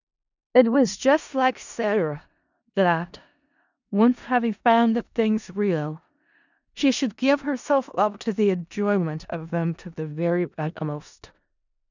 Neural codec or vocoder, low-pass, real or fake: codec, 16 kHz in and 24 kHz out, 0.4 kbps, LongCat-Audio-Codec, four codebook decoder; 7.2 kHz; fake